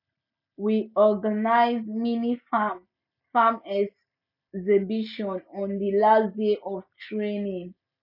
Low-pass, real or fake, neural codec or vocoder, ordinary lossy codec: 5.4 kHz; real; none; none